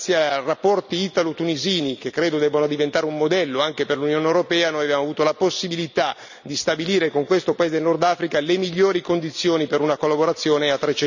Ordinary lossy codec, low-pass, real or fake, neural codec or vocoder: none; 7.2 kHz; real; none